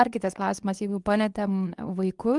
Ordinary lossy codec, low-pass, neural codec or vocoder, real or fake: Opus, 32 kbps; 10.8 kHz; codec, 24 kHz, 0.9 kbps, WavTokenizer, medium speech release version 2; fake